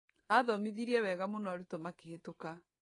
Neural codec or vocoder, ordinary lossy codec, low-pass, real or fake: codec, 24 kHz, 3.1 kbps, DualCodec; AAC, 32 kbps; 10.8 kHz; fake